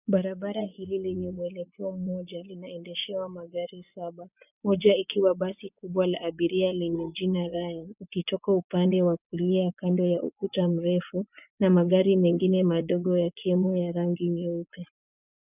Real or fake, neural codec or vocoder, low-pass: fake; vocoder, 24 kHz, 100 mel bands, Vocos; 3.6 kHz